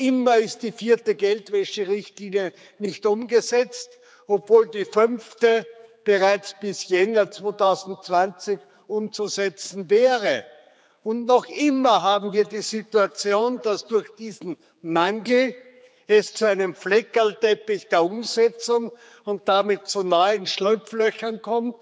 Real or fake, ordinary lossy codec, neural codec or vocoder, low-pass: fake; none; codec, 16 kHz, 4 kbps, X-Codec, HuBERT features, trained on general audio; none